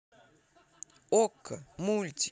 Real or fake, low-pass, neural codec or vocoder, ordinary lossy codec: real; none; none; none